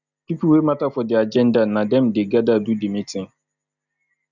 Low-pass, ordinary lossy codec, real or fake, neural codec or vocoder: 7.2 kHz; none; real; none